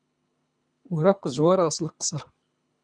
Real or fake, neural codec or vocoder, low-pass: fake; codec, 24 kHz, 3 kbps, HILCodec; 9.9 kHz